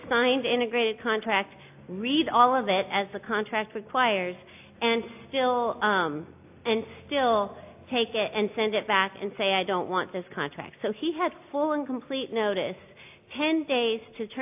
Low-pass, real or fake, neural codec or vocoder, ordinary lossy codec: 3.6 kHz; real; none; AAC, 32 kbps